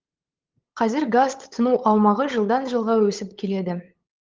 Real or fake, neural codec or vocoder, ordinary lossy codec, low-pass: fake; codec, 16 kHz, 8 kbps, FunCodec, trained on LibriTTS, 25 frames a second; Opus, 16 kbps; 7.2 kHz